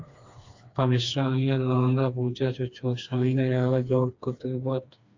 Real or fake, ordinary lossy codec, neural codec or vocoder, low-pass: fake; AAC, 48 kbps; codec, 16 kHz, 2 kbps, FreqCodec, smaller model; 7.2 kHz